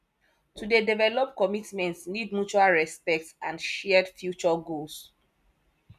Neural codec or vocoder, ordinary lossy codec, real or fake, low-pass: none; none; real; 14.4 kHz